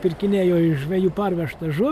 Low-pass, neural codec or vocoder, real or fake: 14.4 kHz; none; real